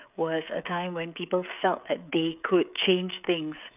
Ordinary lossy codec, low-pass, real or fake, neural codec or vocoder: none; 3.6 kHz; fake; codec, 16 kHz, 16 kbps, FreqCodec, smaller model